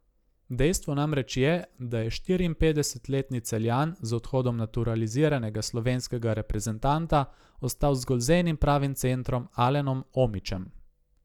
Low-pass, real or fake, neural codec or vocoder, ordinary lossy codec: 19.8 kHz; real; none; none